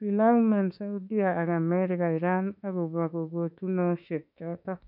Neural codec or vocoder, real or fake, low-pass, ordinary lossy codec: autoencoder, 48 kHz, 32 numbers a frame, DAC-VAE, trained on Japanese speech; fake; 5.4 kHz; none